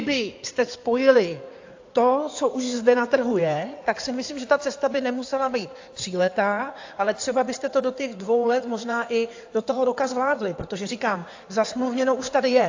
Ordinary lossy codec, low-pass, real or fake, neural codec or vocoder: AAC, 48 kbps; 7.2 kHz; fake; codec, 16 kHz in and 24 kHz out, 2.2 kbps, FireRedTTS-2 codec